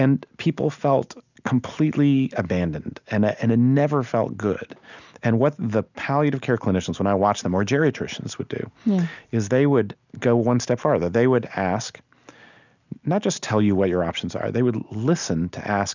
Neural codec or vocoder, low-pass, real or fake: none; 7.2 kHz; real